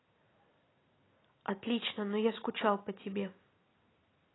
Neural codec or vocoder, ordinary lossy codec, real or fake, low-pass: none; AAC, 16 kbps; real; 7.2 kHz